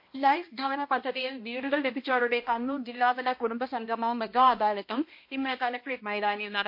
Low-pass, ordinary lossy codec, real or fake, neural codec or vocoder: 5.4 kHz; MP3, 32 kbps; fake; codec, 16 kHz, 1 kbps, X-Codec, HuBERT features, trained on balanced general audio